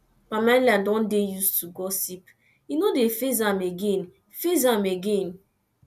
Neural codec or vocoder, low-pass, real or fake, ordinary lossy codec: none; 14.4 kHz; real; none